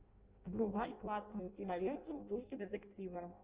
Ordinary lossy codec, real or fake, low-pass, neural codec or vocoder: Opus, 32 kbps; fake; 3.6 kHz; codec, 16 kHz in and 24 kHz out, 0.6 kbps, FireRedTTS-2 codec